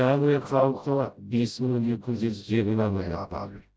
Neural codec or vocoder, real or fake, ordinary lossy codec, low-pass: codec, 16 kHz, 0.5 kbps, FreqCodec, smaller model; fake; none; none